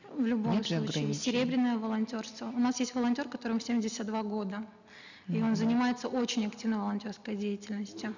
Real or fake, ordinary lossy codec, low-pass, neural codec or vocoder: real; none; 7.2 kHz; none